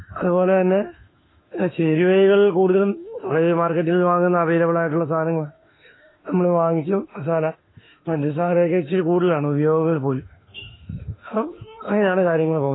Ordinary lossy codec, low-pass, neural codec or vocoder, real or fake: AAC, 16 kbps; 7.2 kHz; autoencoder, 48 kHz, 32 numbers a frame, DAC-VAE, trained on Japanese speech; fake